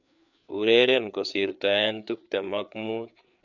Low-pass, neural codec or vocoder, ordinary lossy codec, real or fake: 7.2 kHz; codec, 16 kHz, 4 kbps, FreqCodec, larger model; none; fake